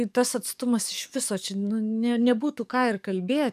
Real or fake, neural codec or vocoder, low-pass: fake; codec, 44.1 kHz, 7.8 kbps, DAC; 14.4 kHz